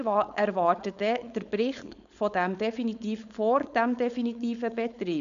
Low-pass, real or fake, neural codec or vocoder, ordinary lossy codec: 7.2 kHz; fake; codec, 16 kHz, 4.8 kbps, FACodec; none